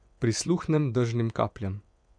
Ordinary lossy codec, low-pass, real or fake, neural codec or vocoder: none; 9.9 kHz; fake; vocoder, 24 kHz, 100 mel bands, Vocos